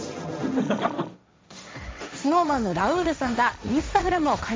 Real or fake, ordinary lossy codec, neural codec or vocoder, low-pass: fake; none; codec, 16 kHz, 1.1 kbps, Voila-Tokenizer; none